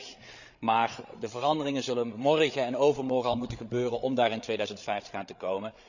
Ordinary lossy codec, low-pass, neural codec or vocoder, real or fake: none; 7.2 kHz; codec, 16 kHz, 8 kbps, FreqCodec, larger model; fake